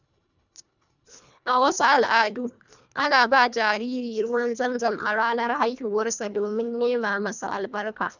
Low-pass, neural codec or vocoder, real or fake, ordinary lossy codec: 7.2 kHz; codec, 24 kHz, 1.5 kbps, HILCodec; fake; none